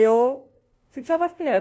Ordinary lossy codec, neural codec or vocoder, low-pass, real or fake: none; codec, 16 kHz, 0.5 kbps, FunCodec, trained on LibriTTS, 25 frames a second; none; fake